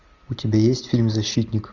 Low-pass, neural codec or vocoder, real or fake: 7.2 kHz; none; real